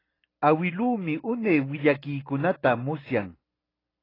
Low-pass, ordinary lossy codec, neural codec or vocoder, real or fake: 5.4 kHz; AAC, 24 kbps; none; real